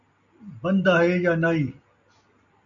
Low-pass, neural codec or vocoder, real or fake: 7.2 kHz; none; real